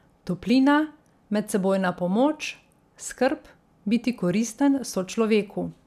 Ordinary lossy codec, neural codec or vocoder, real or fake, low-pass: none; none; real; 14.4 kHz